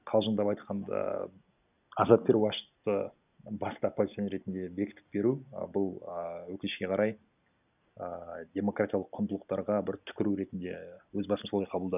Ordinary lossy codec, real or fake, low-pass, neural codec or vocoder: none; real; 3.6 kHz; none